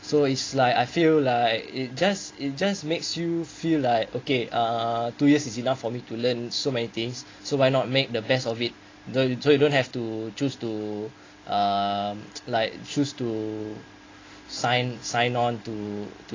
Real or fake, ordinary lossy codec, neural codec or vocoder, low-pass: real; AAC, 32 kbps; none; 7.2 kHz